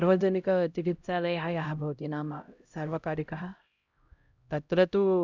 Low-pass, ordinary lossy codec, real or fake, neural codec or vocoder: 7.2 kHz; Opus, 64 kbps; fake; codec, 16 kHz, 0.5 kbps, X-Codec, HuBERT features, trained on LibriSpeech